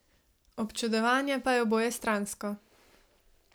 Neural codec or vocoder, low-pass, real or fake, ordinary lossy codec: none; none; real; none